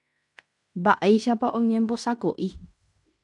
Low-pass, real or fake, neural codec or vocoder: 10.8 kHz; fake; codec, 16 kHz in and 24 kHz out, 0.9 kbps, LongCat-Audio-Codec, fine tuned four codebook decoder